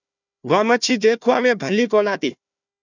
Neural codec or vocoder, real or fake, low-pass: codec, 16 kHz, 1 kbps, FunCodec, trained on Chinese and English, 50 frames a second; fake; 7.2 kHz